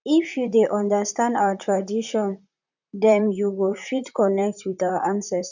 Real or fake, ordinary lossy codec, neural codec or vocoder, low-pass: fake; none; vocoder, 44.1 kHz, 128 mel bands, Pupu-Vocoder; 7.2 kHz